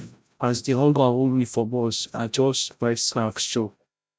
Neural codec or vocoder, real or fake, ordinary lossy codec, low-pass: codec, 16 kHz, 0.5 kbps, FreqCodec, larger model; fake; none; none